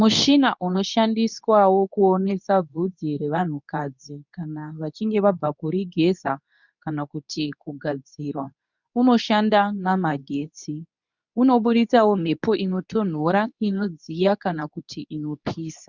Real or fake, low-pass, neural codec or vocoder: fake; 7.2 kHz; codec, 24 kHz, 0.9 kbps, WavTokenizer, medium speech release version 2